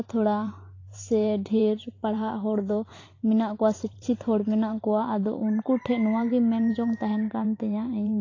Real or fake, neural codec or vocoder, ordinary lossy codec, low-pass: fake; vocoder, 44.1 kHz, 128 mel bands every 512 samples, BigVGAN v2; AAC, 32 kbps; 7.2 kHz